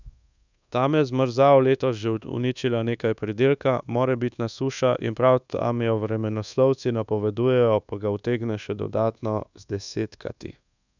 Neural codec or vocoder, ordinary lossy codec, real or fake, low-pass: codec, 24 kHz, 1.2 kbps, DualCodec; none; fake; 7.2 kHz